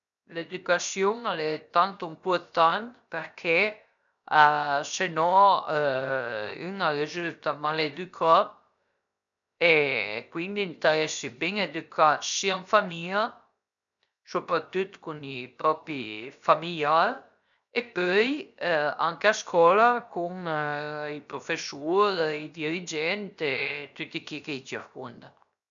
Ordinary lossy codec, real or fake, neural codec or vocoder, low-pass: none; fake; codec, 16 kHz, 0.7 kbps, FocalCodec; 7.2 kHz